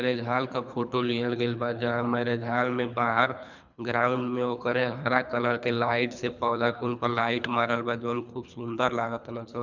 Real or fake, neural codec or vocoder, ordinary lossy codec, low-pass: fake; codec, 24 kHz, 3 kbps, HILCodec; none; 7.2 kHz